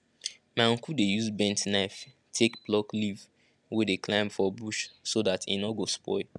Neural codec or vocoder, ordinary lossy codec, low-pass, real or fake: none; none; none; real